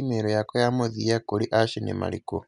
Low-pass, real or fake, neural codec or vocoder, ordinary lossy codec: 10.8 kHz; real; none; none